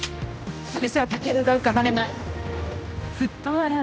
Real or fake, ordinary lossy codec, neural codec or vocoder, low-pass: fake; none; codec, 16 kHz, 1 kbps, X-Codec, HuBERT features, trained on general audio; none